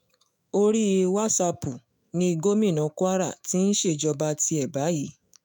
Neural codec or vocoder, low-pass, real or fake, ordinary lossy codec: autoencoder, 48 kHz, 128 numbers a frame, DAC-VAE, trained on Japanese speech; none; fake; none